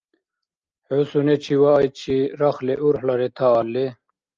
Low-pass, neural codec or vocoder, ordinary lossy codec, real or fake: 7.2 kHz; none; Opus, 24 kbps; real